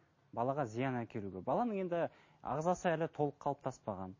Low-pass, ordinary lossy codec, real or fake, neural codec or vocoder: 7.2 kHz; MP3, 32 kbps; real; none